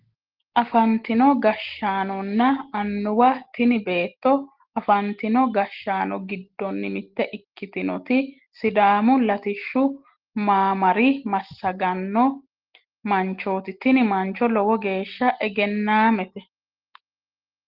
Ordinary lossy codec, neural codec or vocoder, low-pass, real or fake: Opus, 16 kbps; none; 5.4 kHz; real